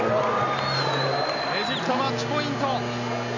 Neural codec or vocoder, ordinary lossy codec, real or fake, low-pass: none; none; real; 7.2 kHz